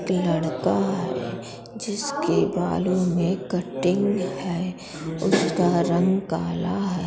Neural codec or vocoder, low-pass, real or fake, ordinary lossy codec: none; none; real; none